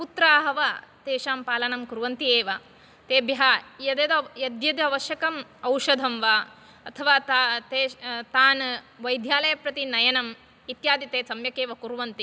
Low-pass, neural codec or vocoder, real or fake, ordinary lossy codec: none; none; real; none